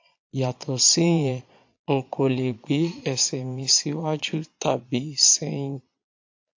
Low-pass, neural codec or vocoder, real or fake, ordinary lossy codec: 7.2 kHz; vocoder, 22.05 kHz, 80 mel bands, Vocos; fake; AAC, 48 kbps